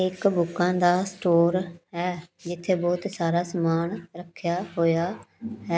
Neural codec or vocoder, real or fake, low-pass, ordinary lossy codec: none; real; none; none